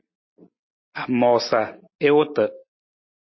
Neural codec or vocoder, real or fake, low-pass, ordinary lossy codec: none; real; 7.2 kHz; MP3, 24 kbps